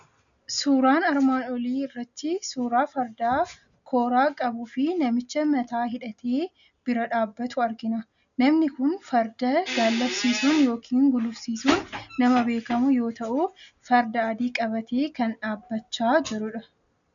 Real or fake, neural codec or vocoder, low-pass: real; none; 7.2 kHz